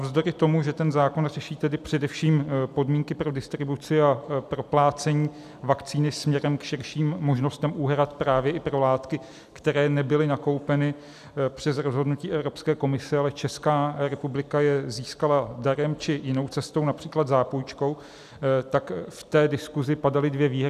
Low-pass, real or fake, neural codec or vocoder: 14.4 kHz; real; none